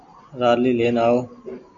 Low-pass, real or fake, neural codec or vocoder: 7.2 kHz; real; none